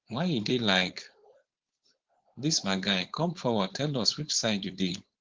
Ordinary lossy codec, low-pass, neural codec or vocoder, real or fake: Opus, 16 kbps; 7.2 kHz; codec, 16 kHz, 4.8 kbps, FACodec; fake